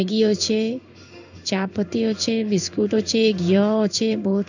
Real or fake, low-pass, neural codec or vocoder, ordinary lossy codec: fake; 7.2 kHz; codec, 16 kHz in and 24 kHz out, 1 kbps, XY-Tokenizer; none